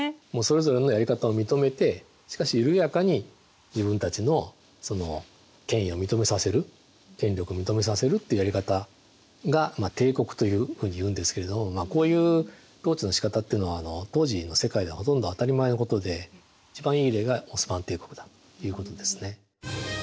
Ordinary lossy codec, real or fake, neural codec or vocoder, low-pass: none; real; none; none